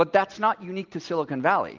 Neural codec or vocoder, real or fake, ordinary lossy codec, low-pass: none; real; Opus, 32 kbps; 7.2 kHz